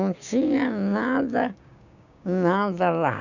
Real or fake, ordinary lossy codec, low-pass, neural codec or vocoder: fake; none; 7.2 kHz; codec, 16 kHz, 6 kbps, DAC